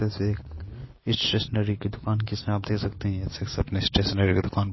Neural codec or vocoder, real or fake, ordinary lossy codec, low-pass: none; real; MP3, 24 kbps; 7.2 kHz